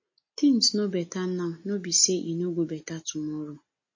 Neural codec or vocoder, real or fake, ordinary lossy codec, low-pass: none; real; MP3, 32 kbps; 7.2 kHz